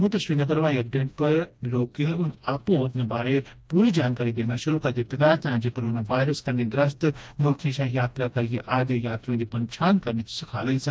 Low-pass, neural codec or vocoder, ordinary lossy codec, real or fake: none; codec, 16 kHz, 1 kbps, FreqCodec, smaller model; none; fake